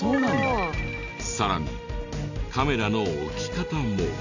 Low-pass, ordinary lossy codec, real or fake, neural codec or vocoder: 7.2 kHz; none; real; none